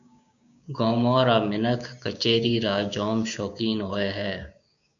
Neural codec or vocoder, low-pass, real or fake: codec, 16 kHz, 6 kbps, DAC; 7.2 kHz; fake